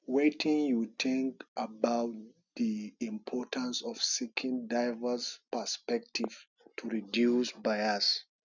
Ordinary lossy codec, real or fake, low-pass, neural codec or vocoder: none; real; 7.2 kHz; none